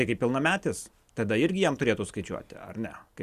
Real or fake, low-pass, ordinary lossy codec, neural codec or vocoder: real; 14.4 kHz; Opus, 64 kbps; none